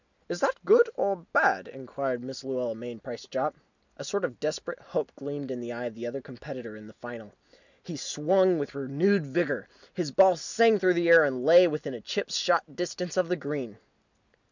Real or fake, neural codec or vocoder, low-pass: real; none; 7.2 kHz